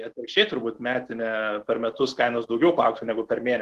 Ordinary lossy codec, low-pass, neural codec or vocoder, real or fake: Opus, 16 kbps; 14.4 kHz; none; real